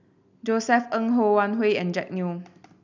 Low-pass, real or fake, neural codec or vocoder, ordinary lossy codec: 7.2 kHz; real; none; none